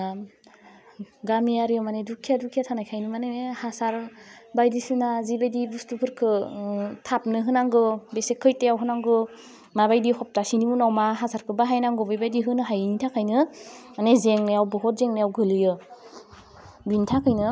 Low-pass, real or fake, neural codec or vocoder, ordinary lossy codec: none; real; none; none